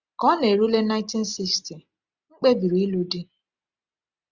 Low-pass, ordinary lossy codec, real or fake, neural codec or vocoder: 7.2 kHz; Opus, 64 kbps; real; none